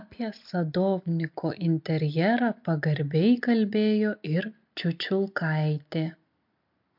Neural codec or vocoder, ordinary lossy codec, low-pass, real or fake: none; MP3, 48 kbps; 5.4 kHz; real